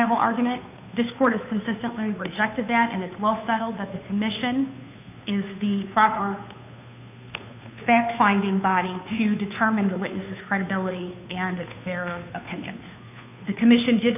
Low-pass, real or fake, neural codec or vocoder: 3.6 kHz; fake; codec, 16 kHz, 2 kbps, FunCodec, trained on Chinese and English, 25 frames a second